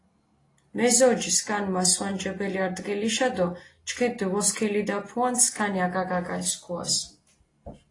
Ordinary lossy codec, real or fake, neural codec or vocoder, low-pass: AAC, 32 kbps; real; none; 10.8 kHz